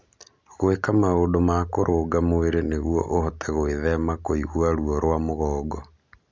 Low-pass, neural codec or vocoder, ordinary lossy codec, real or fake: none; none; none; real